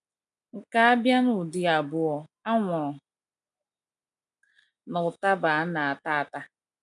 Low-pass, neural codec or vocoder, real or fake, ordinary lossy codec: 10.8 kHz; none; real; MP3, 96 kbps